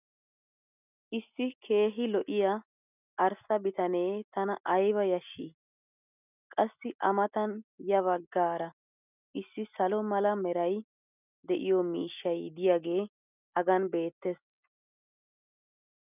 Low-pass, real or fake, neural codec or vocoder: 3.6 kHz; real; none